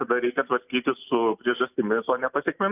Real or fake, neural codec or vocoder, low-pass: real; none; 3.6 kHz